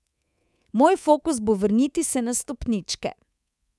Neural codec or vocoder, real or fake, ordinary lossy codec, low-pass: codec, 24 kHz, 3.1 kbps, DualCodec; fake; none; none